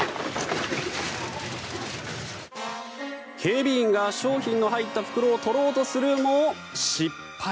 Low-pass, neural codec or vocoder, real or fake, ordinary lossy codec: none; none; real; none